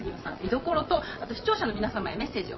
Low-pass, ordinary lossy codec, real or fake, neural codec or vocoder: 7.2 kHz; MP3, 24 kbps; fake; vocoder, 22.05 kHz, 80 mel bands, Vocos